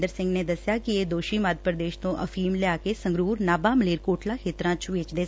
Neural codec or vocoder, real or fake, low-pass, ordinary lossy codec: none; real; none; none